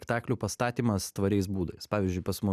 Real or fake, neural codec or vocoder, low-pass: real; none; 14.4 kHz